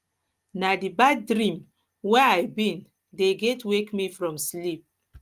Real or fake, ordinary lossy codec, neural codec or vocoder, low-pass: real; Opus, 32 kbps; none; 14.4 kHz